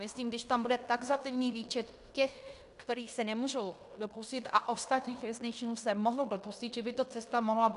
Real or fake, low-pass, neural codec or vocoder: fake; 10.8 kHz; codec, 16 kHz in and 24 kHz out, 0.9 kbps, LongCat-Audio-Codec, fine tuned four codebook decoder